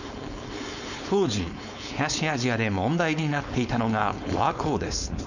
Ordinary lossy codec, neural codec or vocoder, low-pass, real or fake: none; codec, 16 kHz, 4.8 kbps, FACodec; 7.2 kHz; fake